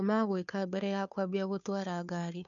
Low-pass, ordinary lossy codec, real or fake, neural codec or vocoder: 7.2 kHz; none; fake; codec, 16 kHz, 2 kbps, FunCodec, trained on Chinese and English, 25 frames a second